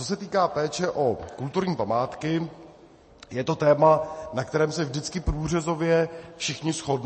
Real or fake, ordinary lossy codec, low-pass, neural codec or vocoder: real; MP3, 32 kbps; 10.8 kHz; none